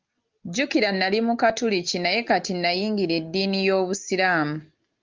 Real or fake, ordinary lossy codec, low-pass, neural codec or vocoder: real; Opus, 24 kbps; 7.2 kHz; none